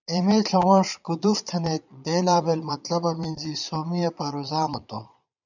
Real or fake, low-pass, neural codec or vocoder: fake; 7.2 kHz; vocoder, 22.05 kHz, 80 mel bands, Vocos